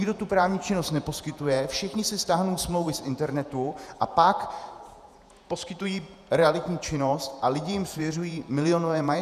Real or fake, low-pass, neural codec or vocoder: fake; 14.4 kHz; vocoder, 48 kHz, 128 mel bands, Vocos